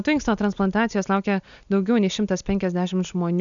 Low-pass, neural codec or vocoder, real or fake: 7.2 kHz; none; real